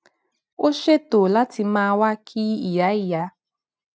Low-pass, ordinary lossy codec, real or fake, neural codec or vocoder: none; none; real; none